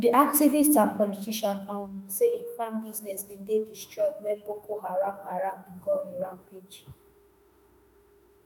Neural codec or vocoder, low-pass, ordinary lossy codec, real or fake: autoencoder, 48 kHz, 32 numbers a frame, DAC-VAE, trained on Japanese speech; none; none; fake